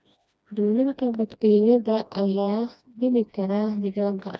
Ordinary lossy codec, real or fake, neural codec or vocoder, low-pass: none; fake; codec, 16 kHz, 1 kbps, FreqCodec, smaller model; none